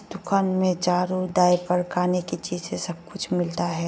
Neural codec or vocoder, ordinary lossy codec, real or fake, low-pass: none; none; real; none